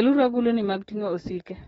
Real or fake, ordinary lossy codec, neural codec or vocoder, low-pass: fake; AAC, 24 kbps; codec, 44.1 kHz, 7.8 kbps, DAC; 19.8 kHz